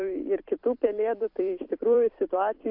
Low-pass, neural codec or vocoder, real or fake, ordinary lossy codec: 5.4 kHz; vocoder, 44.1 kHz, 128 mel bands every 256 samples, BigVGAN v2; fake; AAC, 48 kbps